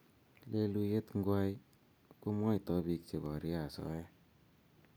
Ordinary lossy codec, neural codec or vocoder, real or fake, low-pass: none; none; real; none